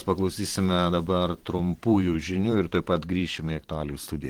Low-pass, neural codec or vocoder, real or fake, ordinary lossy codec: 19.8 kHz; vocoder, 44.1 kHz, 128 mel bands every 512 samples, BigVGAN v2; fake; Opus, 16 kbps